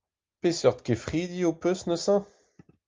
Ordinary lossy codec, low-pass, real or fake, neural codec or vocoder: Opus, 32 kbps; 7.2 kHz; real; none